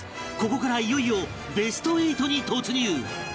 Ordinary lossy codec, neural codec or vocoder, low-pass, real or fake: none; none; none; real